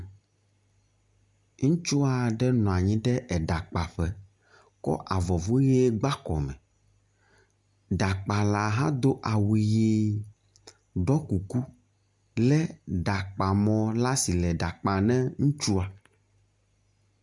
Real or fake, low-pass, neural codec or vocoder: real; 10.8 kHz; none